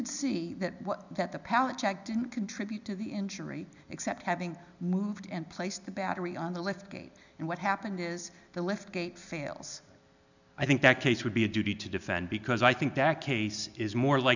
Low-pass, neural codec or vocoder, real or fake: 7.2 kHz; none; real